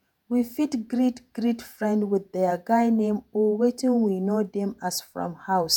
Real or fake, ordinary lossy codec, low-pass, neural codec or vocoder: fake; none; none; vocoder, 48 kHz, 128 mel bands, Vocos